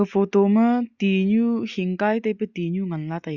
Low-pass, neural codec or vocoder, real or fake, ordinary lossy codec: 7.2 kHz; none; real; Opus, 64 kbps